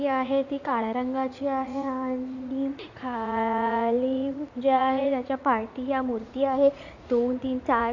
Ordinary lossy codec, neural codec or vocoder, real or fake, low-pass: none; vocoder, 44.1 kHz, 80 mel bands, Vocos; fake; 7.2 kHz